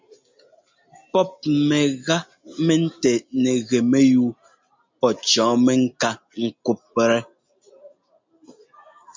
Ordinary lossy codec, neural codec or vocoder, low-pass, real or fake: MP3, 64 kbps; none; 7.2 kHz; real